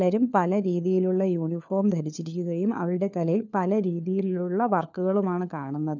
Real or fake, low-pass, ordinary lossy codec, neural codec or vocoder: fake; 7.2 kHz; none; codec, 16 kHz, 4 kbps, FunCodec, trained on LibriTTS, 50 frames a second